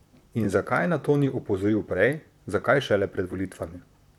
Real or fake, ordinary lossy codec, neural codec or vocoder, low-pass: fake; none; vocoder, 44.1 kHz, 128 mel bands, Pupu-Vocoder; 19.8 kHz